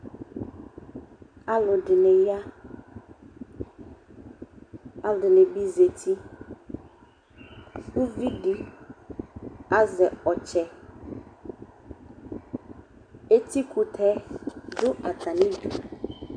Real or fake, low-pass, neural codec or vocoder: real; 9.9 kHz; none